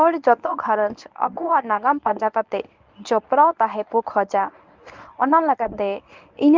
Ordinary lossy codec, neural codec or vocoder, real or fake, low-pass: Opus, 24 kbps; codec, 24 kHz, 0.9 kbps, WavTokenizer, medium speech release version 1; fake; 7.2 kHz